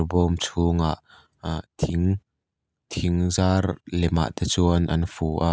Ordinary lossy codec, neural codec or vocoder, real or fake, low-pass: none; none; real; none